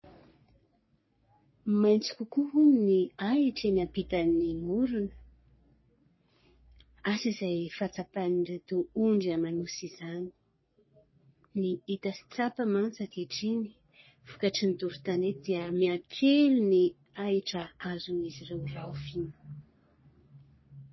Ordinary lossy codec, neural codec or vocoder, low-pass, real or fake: MP3, 24 kbps; codec, 44.1 kHz, 3.4 kbps, Pupu-Codec; 7.2 kHz; fake